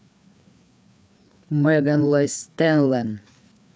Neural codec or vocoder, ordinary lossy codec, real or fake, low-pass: codec, 16 kHz, 4 kbps, FreqCodec, larger model; none; fake; none